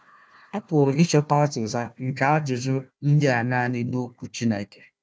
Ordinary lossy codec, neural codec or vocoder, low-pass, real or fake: none; codec, 16 kHz, 1 kbps, FunCodec, trained on Chinese and English, 50 frames a second; none; fake